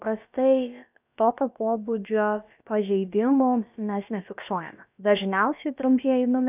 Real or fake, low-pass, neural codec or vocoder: fake; 3.6 kHz; codec, 16 kHz, about 1 kbps, DyCAST, with the encoder's durations